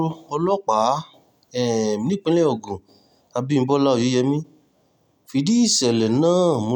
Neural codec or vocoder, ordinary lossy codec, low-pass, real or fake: none; none; 19.8 kHz; real